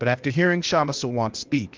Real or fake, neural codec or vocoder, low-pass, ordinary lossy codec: fake; codec, 16 kHz, 0.8 kbps, ZipCodec; 7.2 kHz; Opus, 24 kbps